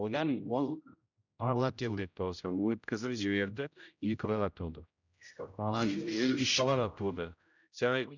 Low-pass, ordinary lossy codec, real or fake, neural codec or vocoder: 7.2 kHz; none; fake; codec, 16 kHz, 0.5 kbps, X-Codec, HuBERT features, trained on general audio